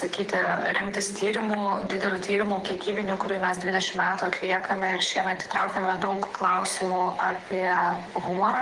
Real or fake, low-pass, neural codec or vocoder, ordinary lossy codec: fake; 10.8 kHz; codec, 24 kHz, 3 kbps, HILCodec; Opus, 24 kbps